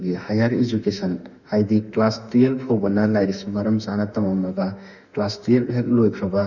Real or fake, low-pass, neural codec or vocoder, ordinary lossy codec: fake; 7.2 kHz; autoencoder, 48 kHz, 32 numbers a frame, DAC-VAE, trained on Japanese speech; none